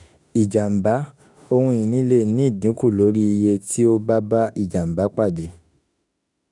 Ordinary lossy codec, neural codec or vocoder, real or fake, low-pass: none; autoencoder, 48 kHz, 32 numbers a frame, DAC-VAE, trained on Japanese speech; fake; 10.8 kHz